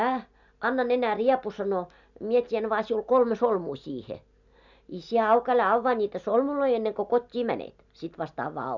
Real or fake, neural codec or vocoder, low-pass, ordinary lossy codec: real; none; 7.2 kHz; none